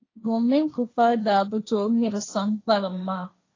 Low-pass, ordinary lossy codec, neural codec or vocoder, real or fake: 7.2 kHz; AAC, 32 kbps; codec, 16 kHz, 1.1 kbps, Voila-Tokenizer; fake